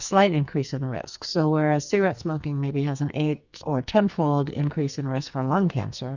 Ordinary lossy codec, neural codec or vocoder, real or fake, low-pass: Opus, 64 kbps; codec, 44.1 kHz, 2.6 kbps, SNAC; fake; 7.2 kHz